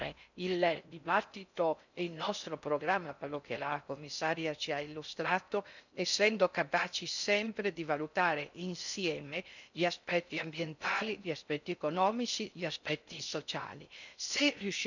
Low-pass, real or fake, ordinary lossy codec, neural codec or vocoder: 7.2 kHz; fake; none; codec, 16 kHz in and 24 kHz out, 0.6 kbps, FocalCodec, streaming, 4096 codes